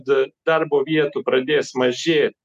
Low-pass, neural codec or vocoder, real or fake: 14.4 kHz; none; real